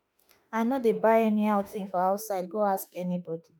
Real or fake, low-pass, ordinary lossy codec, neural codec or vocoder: fake; none; none; autoencoder, 48 kHz, 32 numbers a frame, DAC-VAE, trained on Japanese speech